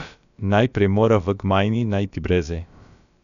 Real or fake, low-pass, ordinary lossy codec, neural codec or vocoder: fake; 7.2 kHz; none; codec, 16 kHz, about 1 kbps, DyCAST, with the encoder's durations